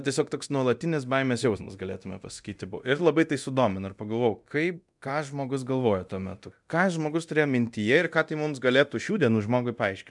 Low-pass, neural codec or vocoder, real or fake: 10.8 kHz; codec, 24 kHz, 0.9 kbps, DualCodec; fake